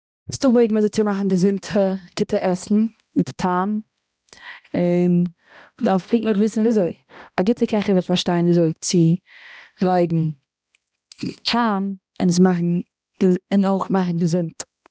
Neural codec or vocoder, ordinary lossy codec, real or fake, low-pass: codec, 16 kHz, 1 kbps, X-Codec, HuBERT features, trained on balanced general audio; none; fake; none